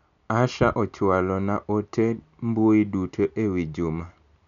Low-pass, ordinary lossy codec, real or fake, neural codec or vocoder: 7.2 kHz; none; real; none